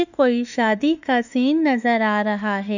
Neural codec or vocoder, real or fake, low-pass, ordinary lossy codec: autoencoder, 48 kHz, 32 numbers a frame, DAC-VAE, trained on Japanese speech; fake; 7.2 kHz; none